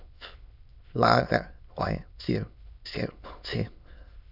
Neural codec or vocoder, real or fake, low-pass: autoencoder, 22.05 kHz, a latent of 192 numbers a frame, VITS, trained on many speakers; fake; 5.4 kHz